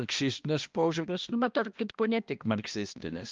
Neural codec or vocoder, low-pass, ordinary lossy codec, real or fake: codec, 16 kHz, 1 kbps, X-Codec, HuBERT features, trained on balanced general audio; 7.2 kHz; Opus, 24 kbps; fake